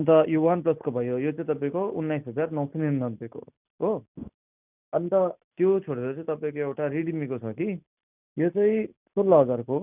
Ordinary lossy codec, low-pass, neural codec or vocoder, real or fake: none; 3.6 kHz; none; real